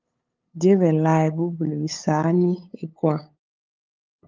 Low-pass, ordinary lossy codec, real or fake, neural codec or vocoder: 7.2 kHz; Opus, 32 kbps; fake; codec, 16 kHz, 8 kbps, FunCodec, trained on LibriTTS, 25 frames a second